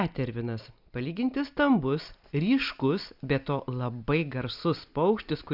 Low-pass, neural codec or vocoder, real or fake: 5.4 kHz; none; real